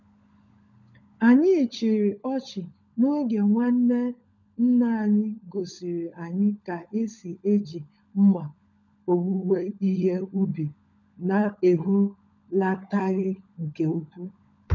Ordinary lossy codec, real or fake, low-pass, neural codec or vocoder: none; fake; 7.2 kHz; codec, 16 kHz, 16 kbps, FunCodec, trained on LibriTTS, 50 frames a second